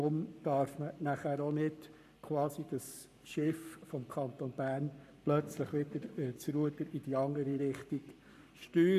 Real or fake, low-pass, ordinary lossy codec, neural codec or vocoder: fake; 14.4 kHz; none; codec, 44.1 kHz, 7.8 kbps, Pupu-Codec